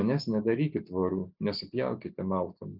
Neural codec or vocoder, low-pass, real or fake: none; 5.4 kHz; real